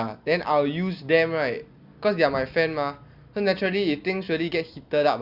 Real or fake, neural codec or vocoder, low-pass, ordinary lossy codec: real; none; 5.4 kHz; Opus, 64 kbps